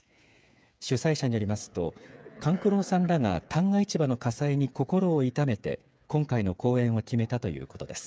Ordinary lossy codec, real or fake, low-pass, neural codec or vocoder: none; fake; none; codec, 16 kHz, 8 kbps, FreqCodec, smaller model